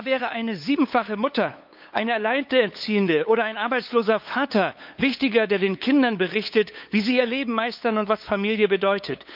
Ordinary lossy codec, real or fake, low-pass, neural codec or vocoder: none; fake; 5.4 kHz; codec, 16 kHz, 8 kbps, FunCodec, trained on LibriTTS, 25 frames a second